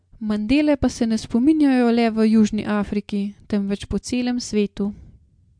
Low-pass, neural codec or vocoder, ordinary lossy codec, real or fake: 9.9 kHz; none; MP3, 64 kbps; real